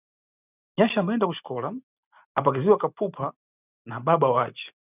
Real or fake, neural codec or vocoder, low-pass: real; none; 3.6 kHz